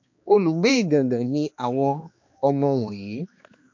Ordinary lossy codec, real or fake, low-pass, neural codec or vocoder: MP3, 48 kbps; fake; 7.2 kHz; codec, 16 kHz, 2 kbps, X-Codec, HuBERT features, trained on balanced general audio